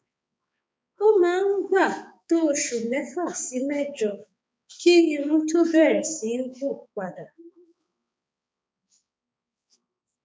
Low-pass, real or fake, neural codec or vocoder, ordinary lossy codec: none; fake; codec, 16 kHz, 4 kbps, X-Codec, HuBERT features, trained on balanced general audio; none